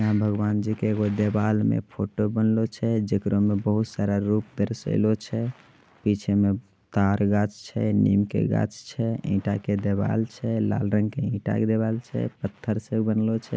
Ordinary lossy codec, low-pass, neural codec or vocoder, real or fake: none; none; none; real